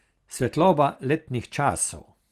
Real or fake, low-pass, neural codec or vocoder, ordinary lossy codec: real; 14.4 kHz; none; Opus, 24 kbps